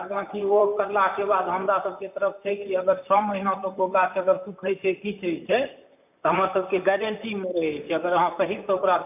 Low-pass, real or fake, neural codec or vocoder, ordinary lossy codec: 3.6 kHz; fake; vocoder, 44.1 kHz, 128 mel bands, Pupu-Vocoder; none